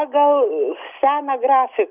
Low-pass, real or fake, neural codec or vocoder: 3.6 kHz; real; none